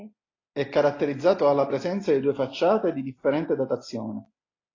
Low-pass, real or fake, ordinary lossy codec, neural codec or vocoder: 7.2 kHz; real; AAC, 32 kbps; none